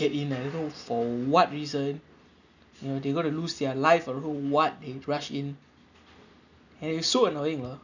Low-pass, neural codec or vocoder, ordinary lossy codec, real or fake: 7.2 kHz; none; none; real